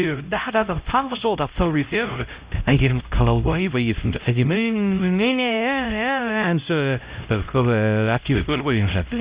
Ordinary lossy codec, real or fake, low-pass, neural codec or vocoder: Opus, 64 kbps; fake; 3.6 kHz; codec, 16 kHz, 0.5 kbps, X-Codec, HuBERT features, trained on LibriSpeech